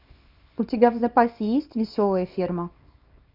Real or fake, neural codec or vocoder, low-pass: fake; codec, 24 kHz, 0.9 kbps, WavTokenizer, small release; 5.4 kHz